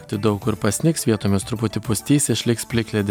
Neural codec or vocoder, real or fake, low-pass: none; real; 19.8 kHz